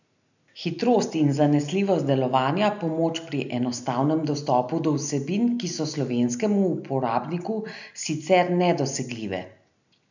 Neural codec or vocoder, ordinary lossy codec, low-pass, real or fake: none; none; 7.2 kHz; real